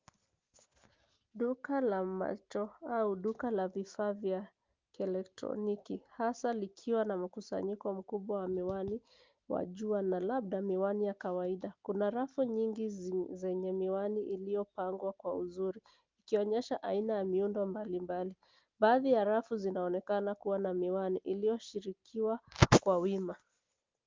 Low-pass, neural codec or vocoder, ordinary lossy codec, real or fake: 7.2 kHz; none; Opus, 24 kbps; real